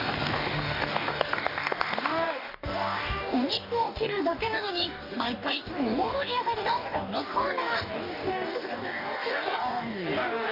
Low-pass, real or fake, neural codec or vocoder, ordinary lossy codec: 5.4 kHz; fake; codec, 44.1 kHz, 2.6 kbps, DAC; none